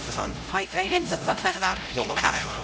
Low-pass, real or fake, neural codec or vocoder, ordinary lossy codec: none; fake; codec, 16 kHz, 0.5 kbps, X-Codec, HuBERT features, trained on LibriSpeech; none